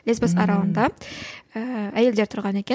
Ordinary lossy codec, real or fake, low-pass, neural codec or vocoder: none; real; none; none